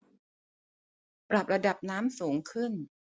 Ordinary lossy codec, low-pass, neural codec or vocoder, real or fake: none; none; none; real